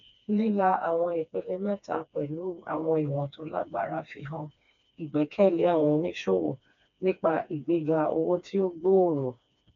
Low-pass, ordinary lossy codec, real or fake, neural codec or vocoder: 7.2 kHz; MP3, 64 kbps; fake; codec, 16 kHz, 2 kbps, FreqCodec, smaller model